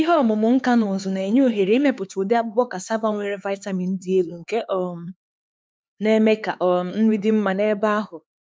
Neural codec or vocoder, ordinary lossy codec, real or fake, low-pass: codec, 16 kHz, 4 kbps, X-Codec, HuBERT features, trained on LibriSpeech; none; fake; none